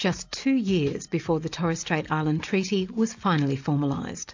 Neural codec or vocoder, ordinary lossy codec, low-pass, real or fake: none; AAC, 48 kbps; 7.2 kHz; real